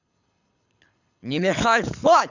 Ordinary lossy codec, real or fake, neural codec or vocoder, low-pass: none; fake; codec, 24 kHz, 6 kbps, HILCodec; 7.2 kHz